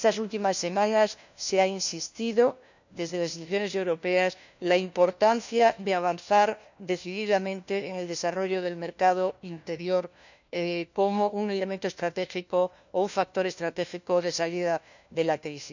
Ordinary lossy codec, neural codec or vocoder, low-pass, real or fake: none; codec, 16 kHz, 1 kbps, FunCodec, trained on LibriTTS, 50 frames a second; 7.2 kHz; fake